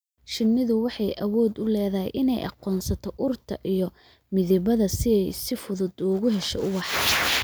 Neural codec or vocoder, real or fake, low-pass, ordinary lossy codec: none; real; none; none